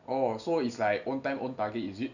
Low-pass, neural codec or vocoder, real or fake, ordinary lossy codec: 7.2 kHz; none; real; none